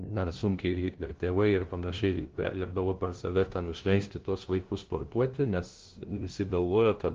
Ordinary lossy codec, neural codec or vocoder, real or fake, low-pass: Opus, 16 kbps; codec, 16 kHz, 0.5 kbps, FunCodec, trained on LibriTTS, 25 frames a second; fake; 7.2 kHz